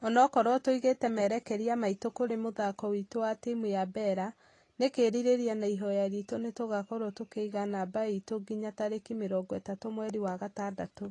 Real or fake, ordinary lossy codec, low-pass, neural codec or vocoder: real; AAC, 32 kbps; 10.8 kHz; none